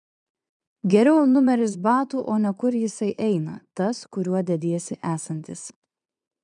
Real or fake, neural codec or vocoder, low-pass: fake; vocoder, 22.05 kHz, 80 mel bands, Vocos; 9.9 kHz